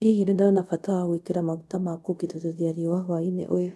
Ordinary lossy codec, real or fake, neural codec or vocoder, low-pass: none; fake; codec, 24 kHz, 0.5 kbps, DualCodec; none